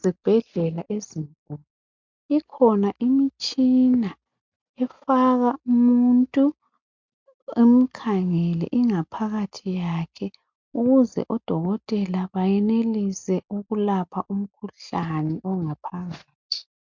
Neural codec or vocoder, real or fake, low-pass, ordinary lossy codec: none; real; 7.2 kHz; MP3, 64 kbps